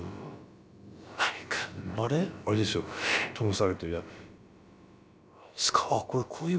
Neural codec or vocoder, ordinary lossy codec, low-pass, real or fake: codec, 16 kHz, about 1 kbps, DyCAST, with the encoder's durations; none; none; fake